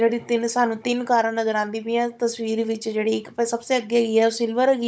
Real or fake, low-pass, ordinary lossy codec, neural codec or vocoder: fake; none; none; codec, 16 kHz, 16 kbps, FunCodec, trained on Chinese and English, 50 frames a second